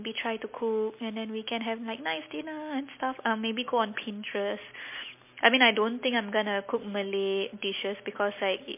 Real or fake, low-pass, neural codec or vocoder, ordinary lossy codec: real; 3.6 kHz; none; MP3, 24 kbps